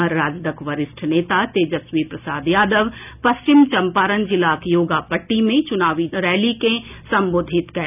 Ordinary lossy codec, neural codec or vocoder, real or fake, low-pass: none; none; real; 3.6 kHz